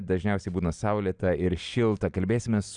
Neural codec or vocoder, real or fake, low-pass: none; real; 9.9 kHz